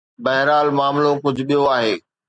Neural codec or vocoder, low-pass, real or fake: none; 9.9 kHz; real